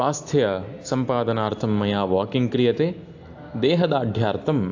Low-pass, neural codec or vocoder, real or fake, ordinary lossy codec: 7.2 kHz; none; real; AAC, 48 kbps